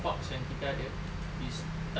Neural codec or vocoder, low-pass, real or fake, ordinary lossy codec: none; none; real; none